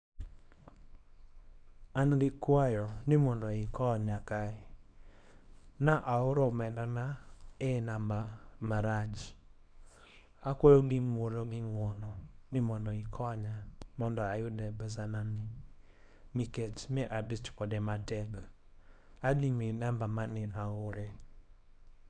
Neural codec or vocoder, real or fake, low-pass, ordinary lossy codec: codec, 24 kHz, 0.9 kbps, WavTokenizer, small release; fake; 9.9 kHz; none